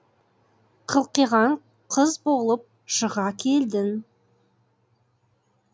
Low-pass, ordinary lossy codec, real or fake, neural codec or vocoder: none; none; real; none